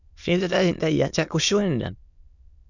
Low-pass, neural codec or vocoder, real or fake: 7.2 kHz; autoencoder, 22.05 kHz, a latent of 192 numbers a frame, VITS, trained on many speakers; fake